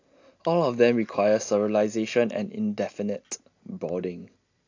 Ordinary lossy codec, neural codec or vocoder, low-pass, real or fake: AAC, 48 kbps; none; 7.2 kHz; real